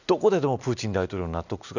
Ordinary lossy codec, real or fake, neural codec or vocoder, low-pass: none; real; none; 7.2 kHz